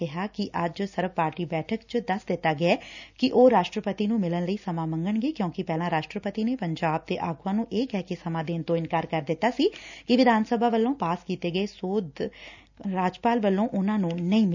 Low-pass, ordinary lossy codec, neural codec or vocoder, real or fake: 7.2 kHz; none; none; real